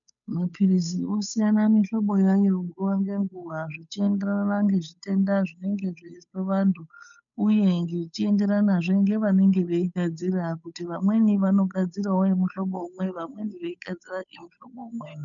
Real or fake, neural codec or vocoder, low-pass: fake; codec, 16 kHz, 8 kbps, FunCodec, trained on Chinese and English, 25 frames a second; 7.2 kHz